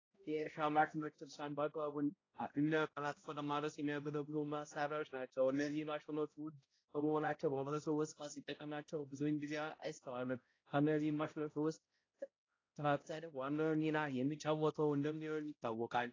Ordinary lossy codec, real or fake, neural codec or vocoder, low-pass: AAC, 32 kbps; fake; codec, 16 kHz, 0.5 kbps, X-Codec, HuBERT features, trained on balanced general audio; 7.2 kHz